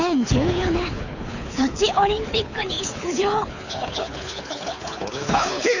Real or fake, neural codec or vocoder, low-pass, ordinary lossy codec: fake; codec, 24 kHz, 6 kbps, HILCodec; 7.2 kHz; none